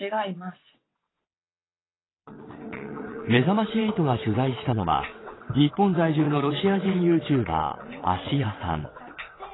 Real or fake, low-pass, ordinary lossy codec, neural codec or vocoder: fake; 7.2 kHz; AAC, 16 kbps; vocoder, 22.05 kHz, 80 mel bands, WaveNeXt